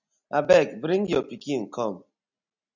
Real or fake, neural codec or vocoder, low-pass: real; none; 7.2 kHz